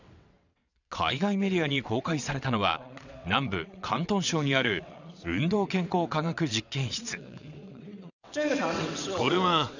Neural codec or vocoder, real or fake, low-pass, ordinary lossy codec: vocoder, 22.05 kHz, 80 mel bands, WaveNeXt; fake; 7.2 kHz; none